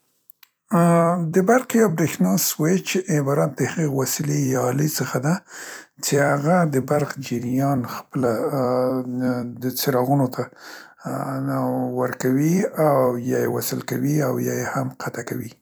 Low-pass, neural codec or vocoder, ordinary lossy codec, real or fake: none; vocoder, 44.1 kHz, 128 mel bands every 256 samples, BigVGAN v2; none; fake